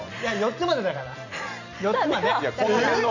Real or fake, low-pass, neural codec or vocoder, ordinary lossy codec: real; 7.2 kHz; none; none